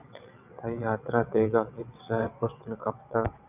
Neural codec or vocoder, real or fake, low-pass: vocoder, 22.05 kHz, 80 mel bands, WaveNeXt; fake; 3.6 kHz